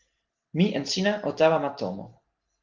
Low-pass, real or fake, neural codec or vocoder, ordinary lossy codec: 7.2 kHz; real; none; Opus, 16 kbps